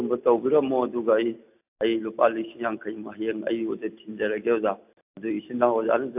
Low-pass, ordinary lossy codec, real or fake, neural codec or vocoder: 3.6 kHz; AAC, 32 kbps; real; none